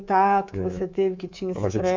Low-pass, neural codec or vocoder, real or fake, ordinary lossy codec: 7.2 kHz; codec, 24 kHz, 3.1 kbps, DualCodec; fake; MP3, 64 kbps